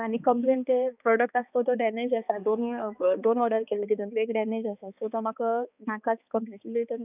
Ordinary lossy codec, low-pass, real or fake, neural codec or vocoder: none; 3.6 kHz; fake; codec, 16 kHz, 2 kbps, X-Codec, HuBERT features, trained on balanced general audio